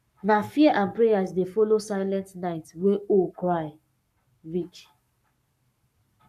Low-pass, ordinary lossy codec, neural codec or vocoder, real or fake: 14.4 kHz; none; codec, 44.1 kHz, 7.8 kbps, Pupu-Codec; fake